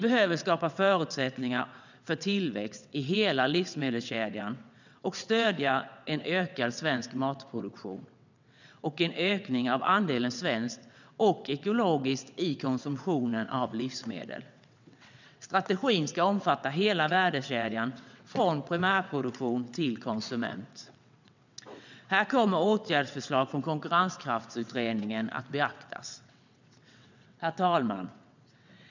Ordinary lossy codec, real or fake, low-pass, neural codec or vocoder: none; fake; 7.2 kHz; vocoder, 22.05 kHz, 80 mel bands, WaveNeXt